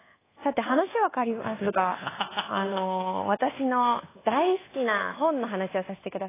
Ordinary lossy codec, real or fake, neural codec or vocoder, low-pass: AAC, 16 kbps; fake; codec, 24 kHz, 1.2 kbps, DualCodec; 3.6 kHz